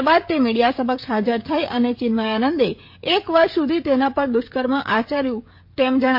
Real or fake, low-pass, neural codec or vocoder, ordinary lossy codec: fake; 5.4 kHz; codec, 16 kHz, 16 kbps, FreqCodec, smaller model; MP3, 32 kbps